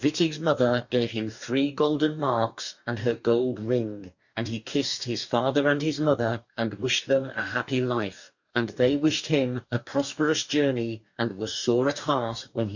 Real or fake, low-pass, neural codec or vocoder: fake; 7.2 kHz; codec, 44.1 kHz, 2.6 kbps, DAC